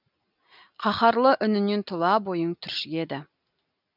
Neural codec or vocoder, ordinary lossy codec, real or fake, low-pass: none; none; real; 5.4 kHz